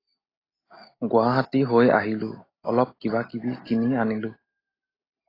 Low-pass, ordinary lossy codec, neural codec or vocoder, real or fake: 5.4 kHz; AAC, 24 kbps; none; real